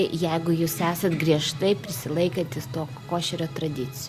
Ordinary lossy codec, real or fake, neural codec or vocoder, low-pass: Opus, 64 kbps; real; none; 14.4 kHz